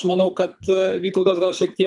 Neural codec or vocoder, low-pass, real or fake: codec, 24 kHz, 3 kbps, HILCodec; 10.8 kHz; fake